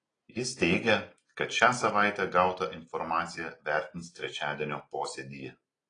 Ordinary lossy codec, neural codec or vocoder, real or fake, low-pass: AAC, 32 kbps; none; real; 9.9 kHz